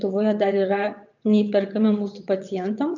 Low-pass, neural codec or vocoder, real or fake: 7.2 kHz; vocoder, 22.05 kHz, 80 mel bands, WaveNeXt; fake